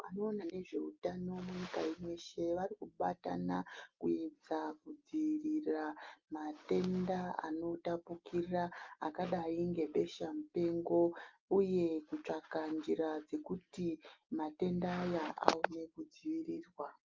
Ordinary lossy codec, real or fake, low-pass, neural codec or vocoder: Opus, 32 kbps; real; 7.2 kHz; none